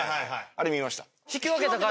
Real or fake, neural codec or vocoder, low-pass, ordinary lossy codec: real; none; none; none